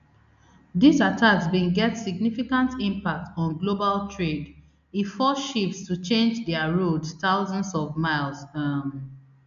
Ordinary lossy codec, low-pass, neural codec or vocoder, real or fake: none; 7.2 kHz; none; real